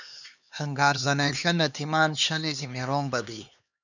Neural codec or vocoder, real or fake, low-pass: codec, 16 kHz, 2 kbps, X-Codec, HuBERT features, trained on LibriSpeech; fake; 7.2 kHz